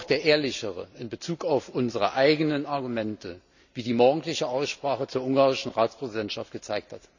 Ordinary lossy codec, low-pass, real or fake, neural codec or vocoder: none; 7.2 kHz; real; none